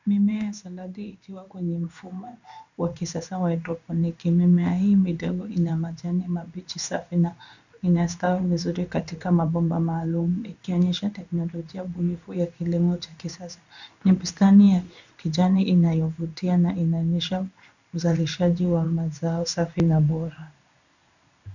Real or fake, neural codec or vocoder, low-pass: fake; codec, 16 kHz in and 24 kHz out, 1 kbps, XY-Tokenizer; 7.2 kHz